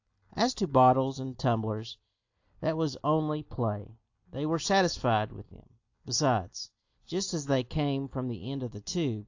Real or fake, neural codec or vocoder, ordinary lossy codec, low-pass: real; none; AAC, 48 kbps; 7.2 kHz